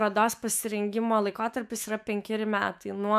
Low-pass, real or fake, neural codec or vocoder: 14.4 kHz; fake; autoencoder, 48 kHz, 128 numbers a frame, DAC-VAE, trained on Japanese speech